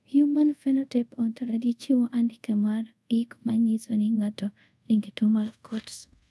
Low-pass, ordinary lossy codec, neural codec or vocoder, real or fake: none; none; codec, 24 kHz, 0.5 kbps, DualCodec; fake